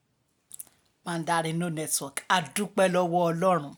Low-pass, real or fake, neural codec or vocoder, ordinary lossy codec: none; real; none; none